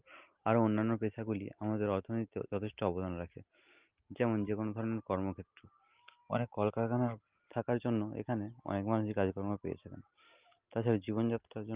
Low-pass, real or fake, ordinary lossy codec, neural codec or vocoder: 3.6 kHz; real; Opus, 32 kbps; none